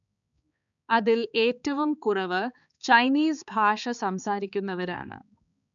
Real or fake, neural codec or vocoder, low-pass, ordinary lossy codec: fake; codec, 16 kHz, 4 kbps, X-Codec, HuBERT features, trained on balanced general audio; 7.2 kHz; none